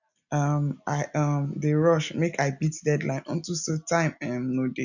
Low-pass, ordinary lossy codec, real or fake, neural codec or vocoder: 7.2 kHz; none; real; none